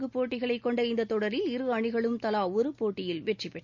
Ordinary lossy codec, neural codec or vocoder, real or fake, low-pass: none; none; real; 7.2 kHz